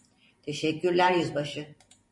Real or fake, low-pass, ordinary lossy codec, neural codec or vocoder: fake; 10.8 kHz; MP3, 64 kbps; vocoder, 44.1 kHz, 128 mel bands every 512 samples, BigVGAN v2